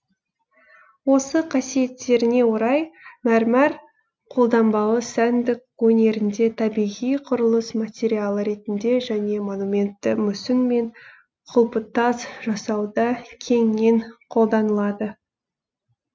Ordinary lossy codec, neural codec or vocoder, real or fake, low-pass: none; none; real; none